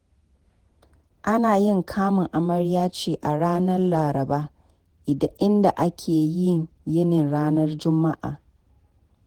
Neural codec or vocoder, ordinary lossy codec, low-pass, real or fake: vocoder, 48 kHz, 128 mel bands, Vocos; Opus, 16 kbps; 19.8 kHz; fake